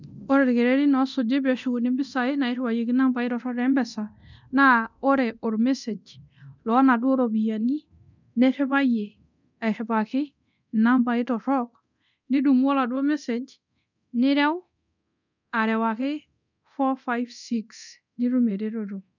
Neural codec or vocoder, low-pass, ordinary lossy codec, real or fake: codec, 24 kHz, 0.9 kbps, DualCodec; 7.2 kHz; none; fake